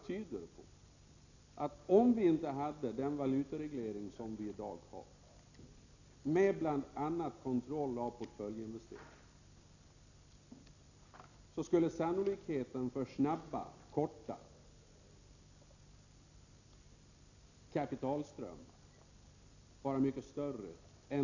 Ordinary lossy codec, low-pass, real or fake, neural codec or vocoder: none; 7.2 kHz; real; none